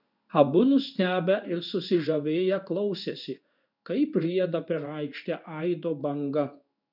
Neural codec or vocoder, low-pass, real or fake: codec, 16 kHz in and 24 kHz out, 1 kbps, XY-Tokenizer; 5.4 kHz; fake